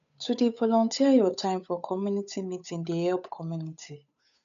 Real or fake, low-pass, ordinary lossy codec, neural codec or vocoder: fake; 7.2 kHz; none; codec, 16 kHz, 8 kbps, FunCodec, trained on Chinese and English, 25 frames a second